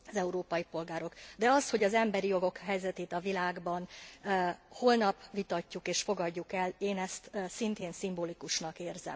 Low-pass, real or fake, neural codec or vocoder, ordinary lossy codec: none; real; none; none